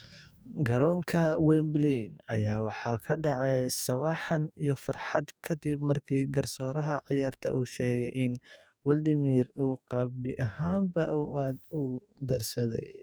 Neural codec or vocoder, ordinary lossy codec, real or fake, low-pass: codec, 44.1 kHz, 2.6 kbps, DAC; none; fake; none